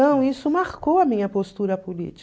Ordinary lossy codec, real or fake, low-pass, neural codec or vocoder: none; real; none; none